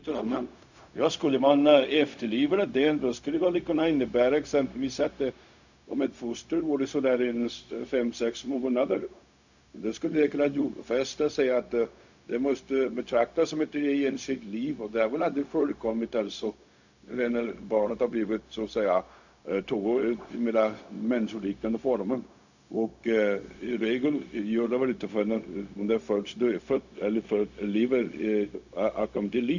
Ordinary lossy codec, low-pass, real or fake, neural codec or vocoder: none; 7.2 kHz; fake; codec, 16 kHz, 0.4 kbps, LongCat-Audio-Codec